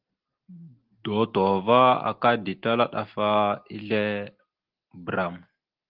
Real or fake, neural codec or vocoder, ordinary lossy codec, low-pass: real; none; Opus, 16 kbps; 5.4 kHz